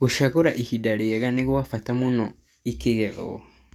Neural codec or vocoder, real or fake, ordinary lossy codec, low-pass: codec, 44.1 kHz, 7.8 kbps, DAC; fake; none; 19.8 kHz